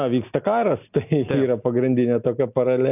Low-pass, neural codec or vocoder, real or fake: 3.6 kHz; none; real